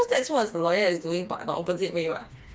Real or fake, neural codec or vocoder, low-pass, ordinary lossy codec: fake; codec, 16 kHz, 2 kbps, FreqCodec, smaller model; none; none